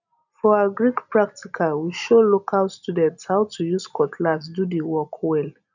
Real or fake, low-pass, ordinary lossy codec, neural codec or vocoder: real; 7.2 kHz; none; none